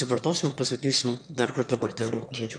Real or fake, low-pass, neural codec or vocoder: fake; 9.9 kHz; autoencoder, 22.05 kHz, a latent of 192 numbers a frame, VITS, trained on one speaker